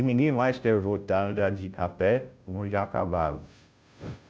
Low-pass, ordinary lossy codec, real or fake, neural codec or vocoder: none; none; fake; codec, 16 kHz, 0.5 kbps, FunCodec, trained on Chinese and English, 25 frames a second